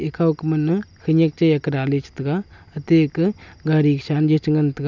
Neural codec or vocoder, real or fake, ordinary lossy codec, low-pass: none; real; none; 7.2 kHz